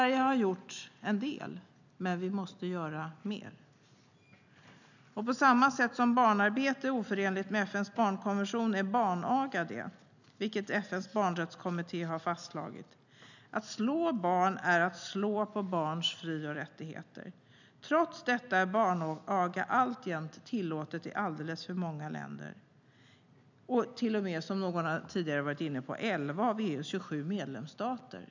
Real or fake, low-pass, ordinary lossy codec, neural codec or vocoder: real; 7.2 kHz; none; none